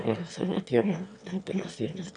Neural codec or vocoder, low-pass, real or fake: autoencoder, 22.05 kHz, a latent of 192 numbers a frame, VITS, trained on one speaker; 9.9 kHz; fake